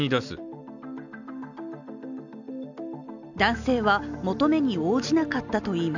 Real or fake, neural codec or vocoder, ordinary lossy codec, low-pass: real; none; none; 7.2 kHz